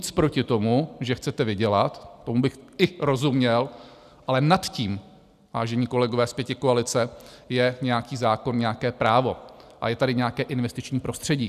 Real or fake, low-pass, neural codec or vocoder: real; 14.4 kHz; none